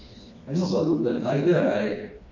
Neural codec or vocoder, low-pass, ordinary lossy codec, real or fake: codec, 16 kHz, 2 kbps, FreqCodec, smaller model; 7.2 kHz; AAC, 48 kbps; fake